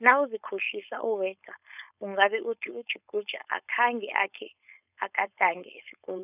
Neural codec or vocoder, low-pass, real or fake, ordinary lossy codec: codec, 16 kHz, 4.8 kbps, FACodec; 3.6 kHz; fake; none